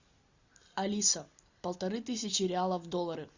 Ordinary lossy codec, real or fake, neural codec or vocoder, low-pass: Opus, 64 kbps; real; none; 7.2 kHz